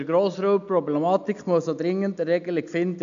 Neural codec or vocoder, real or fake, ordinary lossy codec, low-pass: none; real; none; 7.2 kHz